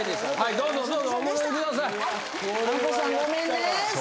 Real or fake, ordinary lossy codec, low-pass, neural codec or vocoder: real; none; none; none